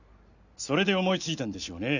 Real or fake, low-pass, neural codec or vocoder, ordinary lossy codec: real; 7.2 kHz; none; none